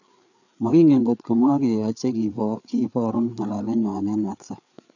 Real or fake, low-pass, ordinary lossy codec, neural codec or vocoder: fake; 7.2 kHz; none; codec, 16 kHz, 4 kbps, FreqCodec, larger model